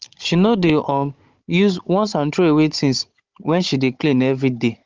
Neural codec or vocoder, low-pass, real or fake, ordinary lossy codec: codec, 16 kHz, 16 kbps, FunCodec, trained on Chinese and English, 50 frames a second; 7.2 kHz; fake; Opus, 24 kbps